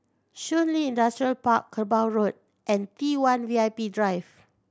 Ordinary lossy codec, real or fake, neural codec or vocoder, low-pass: none; real; none; none